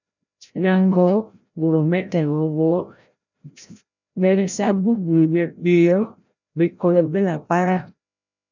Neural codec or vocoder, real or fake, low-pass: codec, 16 kHz, 0.5 kbps, FreqCodec, larger model; fake; 7.2 kHz